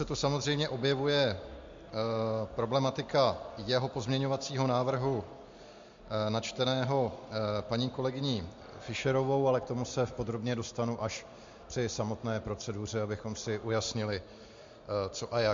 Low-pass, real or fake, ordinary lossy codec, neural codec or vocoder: 7.2 kHz; real; MP3, 48 kbps; none